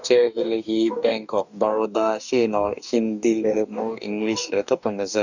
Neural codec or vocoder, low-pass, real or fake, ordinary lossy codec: codec, 44.1 kHz, 2.6 kbps, DAC; 7.2 kHz; fake; none